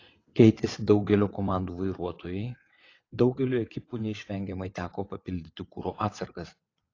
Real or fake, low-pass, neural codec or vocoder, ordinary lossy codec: fake; 7.2 kHz; vocoder, 22.05 kHz, 80 mel bands, WaveNeXt; AAC, 32 kbps